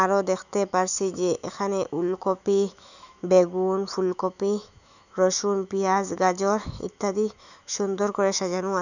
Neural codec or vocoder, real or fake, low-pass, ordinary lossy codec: autoencoder, 48 kHz, 128 numbers a frame, DAC-VAE, trained on Japanese speech; fake; 7.2 kHz; none